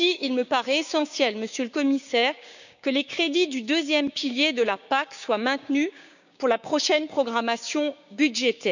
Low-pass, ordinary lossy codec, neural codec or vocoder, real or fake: 7.2 kHz; none; codec, 16 kHz, 6 kbps, DAC; fake